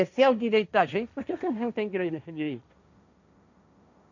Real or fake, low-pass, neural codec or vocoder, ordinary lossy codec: fake; 7.2 kHz; codec, 16 kHz, 1.1 kbps, Voila-Tokenizer; none